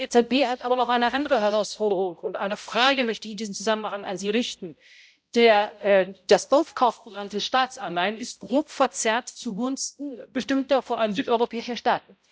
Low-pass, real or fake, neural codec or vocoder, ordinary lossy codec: none; fake; codec, 16 kHz, 0.5 kbps, X-Codec, HuBERT features, trained on balanced general audio; none